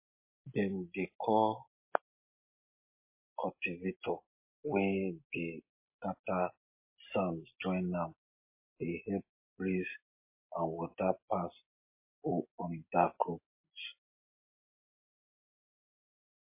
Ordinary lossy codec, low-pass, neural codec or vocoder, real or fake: MP3, 24 kbps; 3.6 kHz; none; real